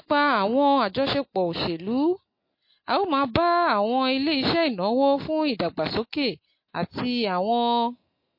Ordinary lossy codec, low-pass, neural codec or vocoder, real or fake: MP3, 32 kbps; 5.4 kHz; none; real